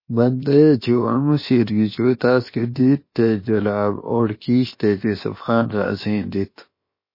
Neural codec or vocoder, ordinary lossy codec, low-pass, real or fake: codec, 16 kHz, about 1 kbps, DyCAST, with the encoder's durations; MP3, 24 kbps; 5.4 kHz; fake